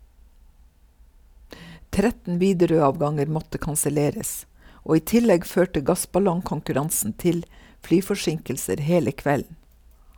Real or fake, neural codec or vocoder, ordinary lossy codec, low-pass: real; none; none; none